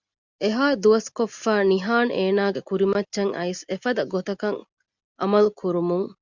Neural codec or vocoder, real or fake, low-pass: none; real; 7.2 kHz